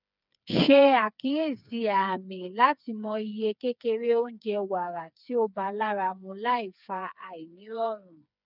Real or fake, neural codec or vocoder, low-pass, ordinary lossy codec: fake; codec, 16 kHz, 4 kbps, FreqCodec, smaller model; 5.4 kHz; none